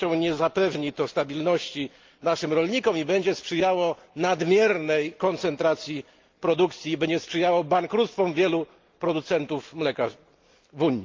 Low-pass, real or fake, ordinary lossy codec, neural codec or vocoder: 7.2 kHz; real; Opus, 24 kbps; none